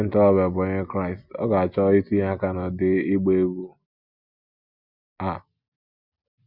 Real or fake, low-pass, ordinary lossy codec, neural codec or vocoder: real; 5.4 kHz; Opus, 64 kbps; none